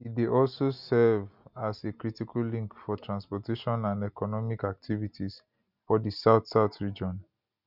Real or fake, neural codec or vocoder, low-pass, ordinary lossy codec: real; none; 5.4 kHz; none